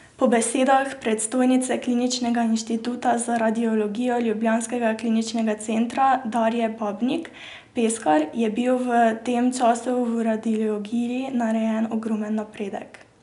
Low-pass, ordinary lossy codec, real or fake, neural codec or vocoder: 10.8 kHz; none; real; none